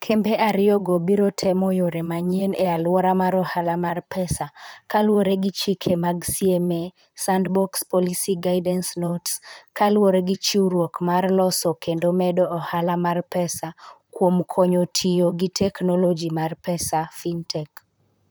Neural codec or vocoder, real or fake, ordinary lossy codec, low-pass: vocoder, 44.1 kHz, 128 mel bands, Pupu-Vocoder; fake; none; none